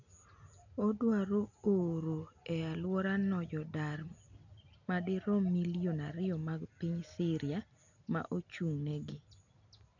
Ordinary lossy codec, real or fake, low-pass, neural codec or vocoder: none; real; 7.2 kHz; none